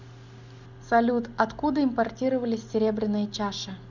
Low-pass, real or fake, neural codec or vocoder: 7.2 kHz; real; none